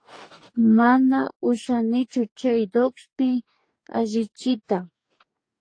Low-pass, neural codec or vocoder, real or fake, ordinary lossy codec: 9.9 kHz; codec, 44.1 kHz, 2.6 kbps, DAC; fake; AAC, 48 kbps